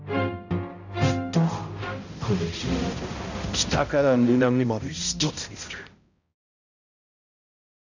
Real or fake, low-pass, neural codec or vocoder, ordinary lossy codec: fake; 7.2 kHz; codec, 16 kHz, 0.5 kbps, X-Codec, HuBERT features, trained on balanced general audio; none